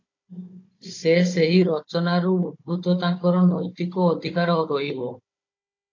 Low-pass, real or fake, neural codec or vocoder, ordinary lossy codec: 7.2 kHz; fake; codec, 16 kHz, 16 kbps, FunCodec, trained on Chinese and English, 50 frames a second; AAC, 48 kbps